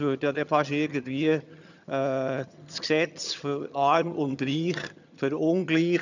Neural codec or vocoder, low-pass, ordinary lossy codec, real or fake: vocoder, 22.05 kHz, 80 mel bands, HiFi-GAN; 7.2 kHz; none; fake